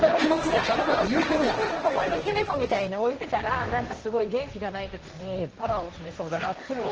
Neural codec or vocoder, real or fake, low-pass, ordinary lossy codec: codec, 16 kHz, 1.1 kbps, Voila-Tokenizer; fake; 7.2 kHz; Opus, 16 kbps